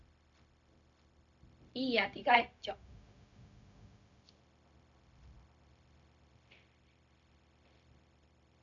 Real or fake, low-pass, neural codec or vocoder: fake; 7.2 kHz; codec, 16 kHz, 0.4 kbps, LongCat-Audio-Codec